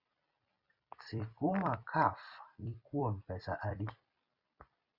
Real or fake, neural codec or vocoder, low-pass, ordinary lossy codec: fake; vocoder, 44.1 kHz, 128 mel bands every 256 samples, BigVGAN v2; 5.4 kHz; Opus, 64 kbps